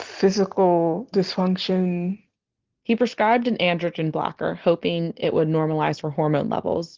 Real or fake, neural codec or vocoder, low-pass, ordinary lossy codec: real; none; 7.2 kHz; Opus, 16 kbps